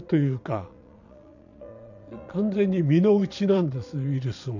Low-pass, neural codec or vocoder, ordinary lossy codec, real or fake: 7.2 kHz; vocoder, 22.05 kHz, 80 mel bands, Vocos; none; fake